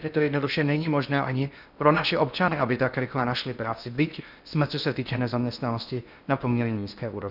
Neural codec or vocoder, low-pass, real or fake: codec, 16 kHz in and 24 kHz out, 0.6 kbps, FocalCodec, streaming, 2048 codes; 5.4 kHz; fake